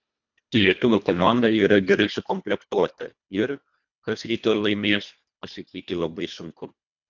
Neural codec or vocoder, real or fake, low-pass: codec, 24 kHz, 1.5 kbps, HILCodec; fake; 7.2 kHz